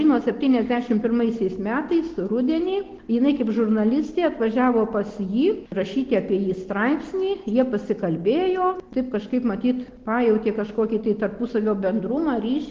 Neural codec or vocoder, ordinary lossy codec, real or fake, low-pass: none; Opus, 16 kbps; real; 7.2 kHz